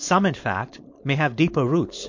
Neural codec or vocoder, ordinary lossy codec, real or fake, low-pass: codec, 16 kHz, 4.8 kbps, FACodec; MP3, 48 kbps; fake; 7.2 kHz